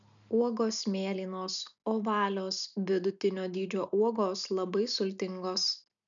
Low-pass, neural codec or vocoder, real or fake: 7.2 kHz; none; real